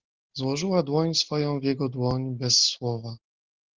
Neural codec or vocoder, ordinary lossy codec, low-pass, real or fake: none; Opus, 16 kbps; 7.2 kHz; real